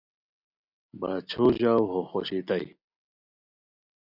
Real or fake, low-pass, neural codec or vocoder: real; 5.4 kHz; none